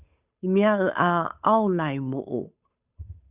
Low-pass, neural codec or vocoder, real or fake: 3.6 kHz; codec, 16 kHz, 8 kbps, FunCodec, trained on Chinese and English, 25 frames a second; fake